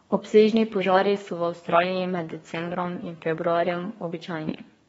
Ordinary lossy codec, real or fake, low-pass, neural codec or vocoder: AAC, 24 kbps; fake; 14.4 kHz; codec, 32 kHz, 1.9 kbps, SNAC